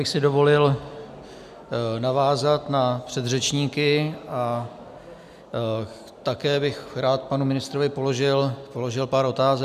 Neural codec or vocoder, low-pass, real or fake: none; 14.4 kHz; real